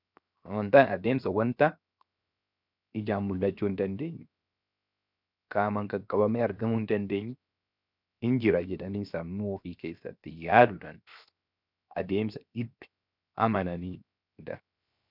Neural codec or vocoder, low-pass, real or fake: codec, 16 kHz, 0.7 kbps, FocalCodec; 5.4 kHz; fake